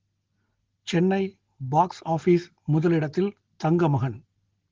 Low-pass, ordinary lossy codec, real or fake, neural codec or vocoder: 7.2 kHz; Opus, 16 kbps; real; none